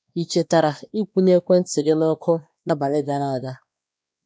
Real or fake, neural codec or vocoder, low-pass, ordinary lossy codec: fake; codec, 16 kHz, 2 kbps, X-Codec, WavLM features, trained on Multilingual LibriSpeech; none; none